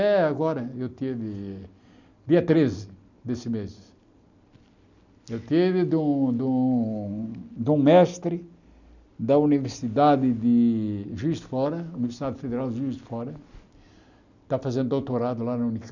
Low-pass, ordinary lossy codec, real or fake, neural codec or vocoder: 7.2 kHz; none; real; none